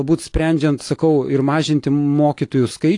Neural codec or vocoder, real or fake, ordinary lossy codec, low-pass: none; real; AAC, 48 kbps; 10.8 kHz